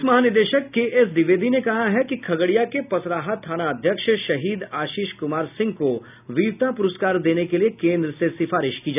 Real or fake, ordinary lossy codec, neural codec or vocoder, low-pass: real; none; none; 3.6 kHz